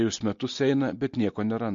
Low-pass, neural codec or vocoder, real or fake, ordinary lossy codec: 7.2 kHz; none; real; MP3, 48 kbps